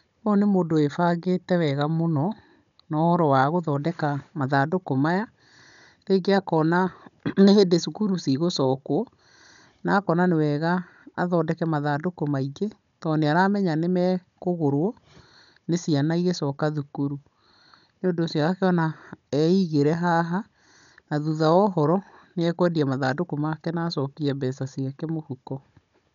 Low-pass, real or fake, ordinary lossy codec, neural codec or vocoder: 7.2 kHz; fake; none; codec, 16 kHz, 16 kbps, FunCodec, trained on Chinese and English, 50 frames a second